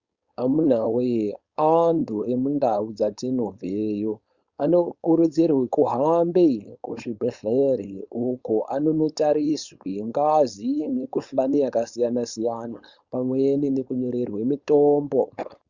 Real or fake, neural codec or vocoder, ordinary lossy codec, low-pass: fake; codec, 16 kHz, 4.8 kbps, FACodec; Opus, 64 kbps; 7.2 kHz